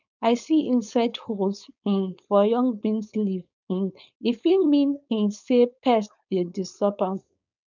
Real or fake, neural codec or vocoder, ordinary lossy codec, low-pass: fake; codec, 16 kHz, 4.8 kbps, FACodec; none; 7.2 kHz